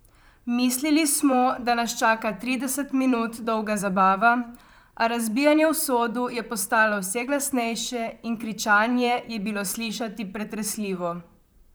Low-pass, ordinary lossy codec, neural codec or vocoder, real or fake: none; none; vocoder, 44.1 kHz, 128 mel bands, Pupu-Vocoder; fake